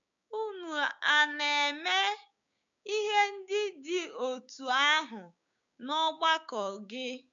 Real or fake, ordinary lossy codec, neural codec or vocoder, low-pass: fake; MP3, 64 kbps; codec, 16 kHz, 6 kbps, DAC; 7.2 kHz